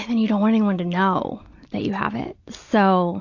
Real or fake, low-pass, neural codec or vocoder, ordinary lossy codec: real; 7.2 kHz; none; AAC, 48 kbps